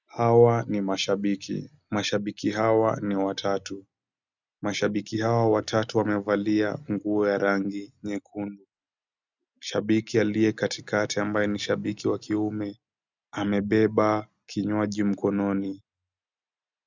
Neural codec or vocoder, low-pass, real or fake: none; 7.2 kHz; real